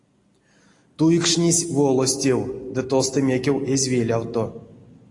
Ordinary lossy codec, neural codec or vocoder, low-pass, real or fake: AAC, 48 kbps; none; 10.8 kHz; real